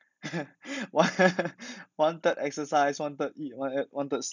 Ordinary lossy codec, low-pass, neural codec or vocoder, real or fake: none; 7.2 kHz; none; real